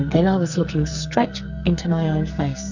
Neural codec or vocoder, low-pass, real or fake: codec, 44.1 kHz, 2.6 kbps, SNAC; 7.2 kHz; fake